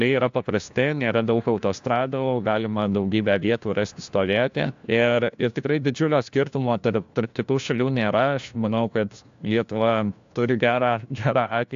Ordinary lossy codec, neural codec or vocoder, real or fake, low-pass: AAC, 64 kbps; codec, 16 kHz, 1 kbps, FunCodec, trained on LibriTTS, 50 frames a second; fake; 7.2 kHz